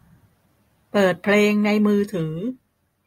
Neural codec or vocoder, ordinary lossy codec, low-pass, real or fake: none; AAC, 48 kbps; 19.8 kHz; real